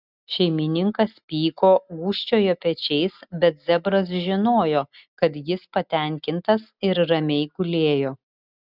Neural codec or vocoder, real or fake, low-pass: none; real; 5.4 kHz